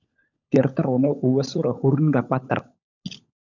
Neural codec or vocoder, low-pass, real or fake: codec, 16 kHz, 16 kbps, FunCodec, trained on LibriTTS, 50 frames a second; 7.2 kHz; fake